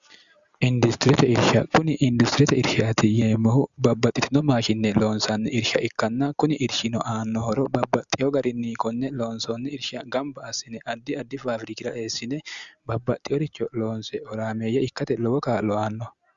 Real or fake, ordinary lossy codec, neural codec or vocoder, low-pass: real; Opus, 64 kbps; none; 7.2 kHz